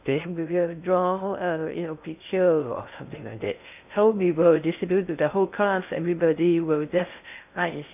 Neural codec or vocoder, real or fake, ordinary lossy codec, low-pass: codec, 16 kHz in and 24 kHz out, 0.6 kbps, FocalCodec, streaming, 2048 codes; fake; none; 3.6 kHz